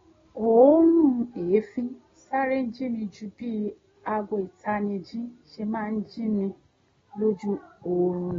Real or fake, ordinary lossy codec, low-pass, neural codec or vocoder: real; AAC, 24 kbps; 7.2 kHz; none